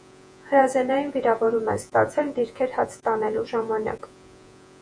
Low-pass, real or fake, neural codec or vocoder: 9.9 kHz; fake; vocoder, 48 kHz, 128 mel bands, Vocos